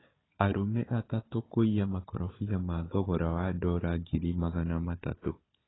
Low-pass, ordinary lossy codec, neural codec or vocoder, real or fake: 7.2 kHz; AAC, 16 kbps; codec, 16 kHz, 4 kbps, FunCodec, trained on Chinese and English, 50 frames a second; fake